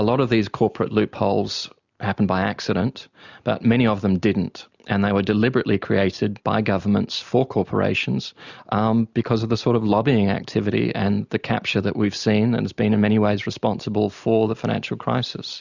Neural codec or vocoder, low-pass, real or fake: none; 7.2 kHz; real